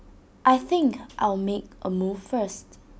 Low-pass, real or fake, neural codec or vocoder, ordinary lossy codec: none; real; none; none